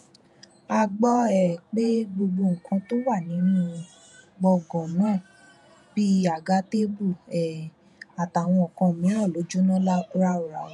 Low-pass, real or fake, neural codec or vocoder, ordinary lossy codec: 10.8 kHz; fake; vocoder, 48 kHz, 128 mel bands, Vocos; none